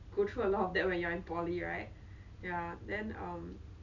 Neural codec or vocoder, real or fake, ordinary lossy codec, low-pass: none; real; none; 7.2 kHz